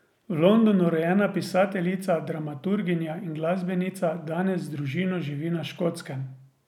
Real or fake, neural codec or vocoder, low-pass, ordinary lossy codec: real; none; 19.8 kHz; none